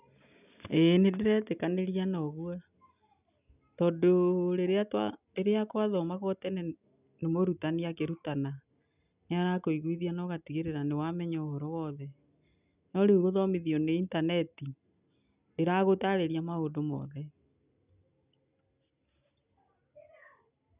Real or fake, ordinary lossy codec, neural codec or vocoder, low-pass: real; none; none; 3.6 kHz